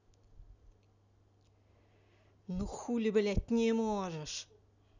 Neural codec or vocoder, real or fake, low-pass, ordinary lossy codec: none; real; 7.2 kHz; none